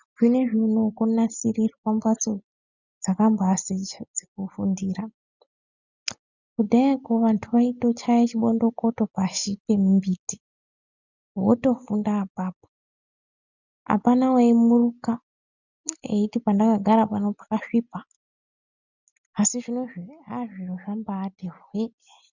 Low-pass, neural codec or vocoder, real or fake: 7.2 kHz; none; real